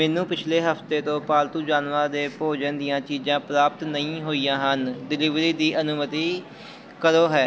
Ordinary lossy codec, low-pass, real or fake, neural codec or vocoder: none; none; real; none